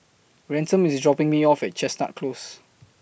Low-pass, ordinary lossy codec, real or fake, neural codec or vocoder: none; none; real; none